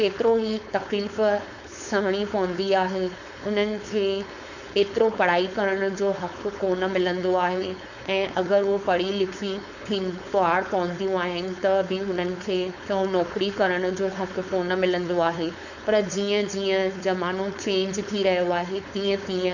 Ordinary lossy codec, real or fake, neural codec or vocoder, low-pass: none; fake; codec, 16 kHz, 4.8 kbps, FACodec; 7.2 kHz